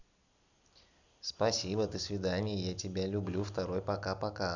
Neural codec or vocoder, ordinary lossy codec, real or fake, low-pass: none; none; real; 7.2 kHz